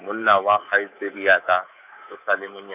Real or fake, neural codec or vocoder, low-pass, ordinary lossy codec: fake; codec, 24 kHz, 3.1 kbps, DualCodec; 3.6 kHz; none